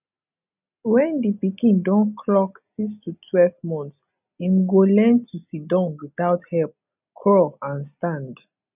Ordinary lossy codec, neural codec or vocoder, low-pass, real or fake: none; none; 3.6 kHz; real